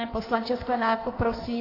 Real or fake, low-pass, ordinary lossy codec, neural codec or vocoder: fake; 5.4 kHz; AAC, 24 kbps; codec, 16 kHz in and 24 kHz out, 1.1 kbps, FireRedTTS-2 codec